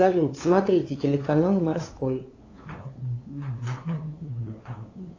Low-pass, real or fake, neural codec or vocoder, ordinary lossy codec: 7.2 kHz; fake; codec, 16 kHz, 2 kbps, FunCodec, trained on LibriTTS, 25 frames a second; AAC, 32 kbps